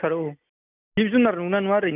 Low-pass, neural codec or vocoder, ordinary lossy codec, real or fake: 3.6 kHz; none; none; real